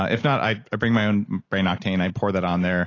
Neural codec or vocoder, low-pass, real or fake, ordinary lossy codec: none; 7.2 kHz; real; AAC, 32 kbps